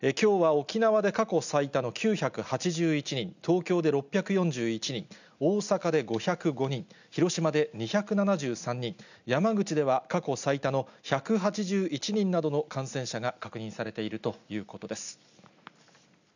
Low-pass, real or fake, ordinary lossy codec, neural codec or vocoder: 7.2 kHz; real; none; none